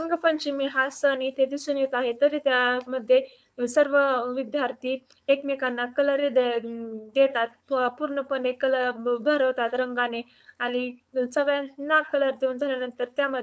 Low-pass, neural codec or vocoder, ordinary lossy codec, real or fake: none; codec, 16 kHz, 4.8 kbps, FACodec; none; fake